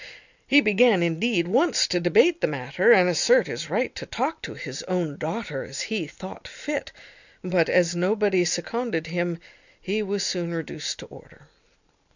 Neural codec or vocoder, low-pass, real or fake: none; 7.2 kHz; real